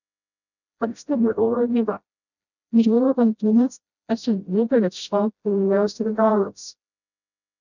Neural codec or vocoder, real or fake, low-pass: codec, 16 kHz, 0.5 kbps, FreqCodec, smaller model; fake; 7.2 kHz